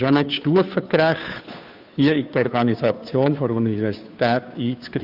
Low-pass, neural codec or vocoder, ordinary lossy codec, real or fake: 5.4 kHz; codec, 16 kHz, 2 kbps, FunCodec, trained on Chinese and English, 25 frames a second; AAC, 48 kbps; fake